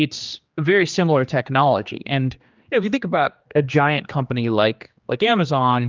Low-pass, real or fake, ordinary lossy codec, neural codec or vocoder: 7.2 kHz; fake; Opus, 24 kbps; codec, 16 kHz, 2 kbps, X-Codec, HuBERT features, trained on general audio